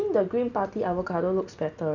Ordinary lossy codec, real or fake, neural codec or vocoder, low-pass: none; real; none; 7.2 kHz